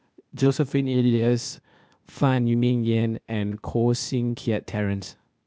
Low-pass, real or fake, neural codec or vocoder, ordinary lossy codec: none; fake; codec, 16 kHz, 0.8 kbps, ZipCodec; none